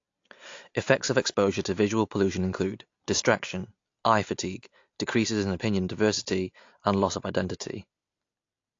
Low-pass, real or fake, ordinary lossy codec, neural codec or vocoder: 7.2 kHz; real; AAC, 48 kbps; none